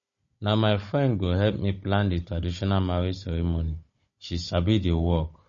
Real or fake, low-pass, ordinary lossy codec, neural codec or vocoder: fake; 7.2 kHz; MP3, 32 kbps; codec, 16 kHz, 16 kbps, FunCodec, trained on Chinese and English, 50 frames a second